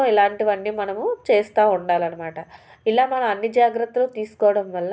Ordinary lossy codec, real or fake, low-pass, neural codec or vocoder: none; real; none; none